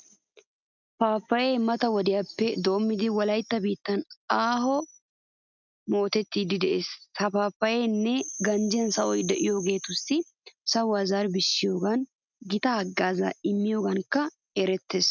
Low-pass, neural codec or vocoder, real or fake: 7.2 kHz; none; real